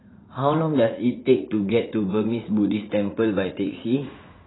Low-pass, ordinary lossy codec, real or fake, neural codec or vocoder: 7.2 kHz; AAC, 16 kbps; fake; vocoder, 44.1 kHz, 80 mel bands, Vocos